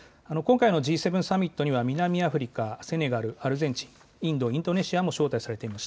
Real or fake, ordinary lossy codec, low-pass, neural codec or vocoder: real; none; none; none